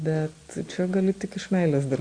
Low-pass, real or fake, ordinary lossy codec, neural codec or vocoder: 9.9 kHz; real; Opus, 64 kbps; none